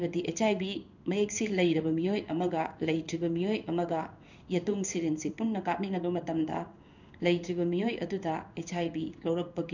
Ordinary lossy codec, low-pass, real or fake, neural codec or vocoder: none; 7.2 kHz; fake; codec, 16 kHz in and 24 kHz out, 1 kbps, XY-Tokenizer